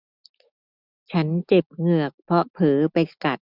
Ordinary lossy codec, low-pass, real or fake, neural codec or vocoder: none; 5.4 kHz; real; none